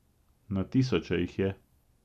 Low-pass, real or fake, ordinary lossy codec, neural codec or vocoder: 14.4 kHz; real; none; none